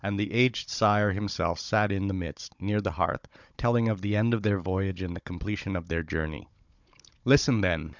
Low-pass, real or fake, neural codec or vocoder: 7.2 kHz; fake; codec, 16 kHz, 16 kbps, FunCodec, trained on Chinese and English, 50 frames a second